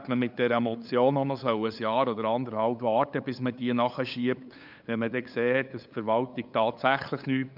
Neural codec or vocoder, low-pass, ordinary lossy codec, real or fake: codec, 16 kHz, 8 kbps, FunCodec, trained on LibriTTS, 25 frames a second; 5.4 kHz; none; fake